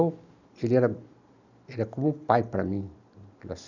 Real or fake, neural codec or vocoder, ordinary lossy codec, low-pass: real; none; none; 7.2 kHz